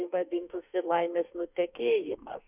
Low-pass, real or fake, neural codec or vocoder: 3.6 kHz; fake; autoencoder, 48 kHz, 32 numbers a frame, DAC-VAE, trained on Japanese speech